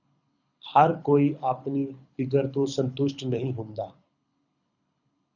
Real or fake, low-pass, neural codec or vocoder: fake; 7.2 kHz; codec, 24 kHz, 6 kbps, HILCodec